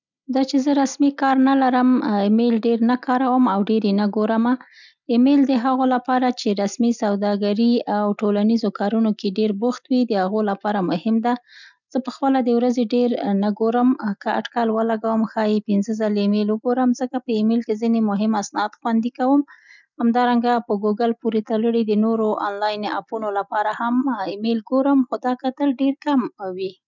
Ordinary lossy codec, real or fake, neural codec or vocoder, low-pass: none; real; none; 7.2 kHz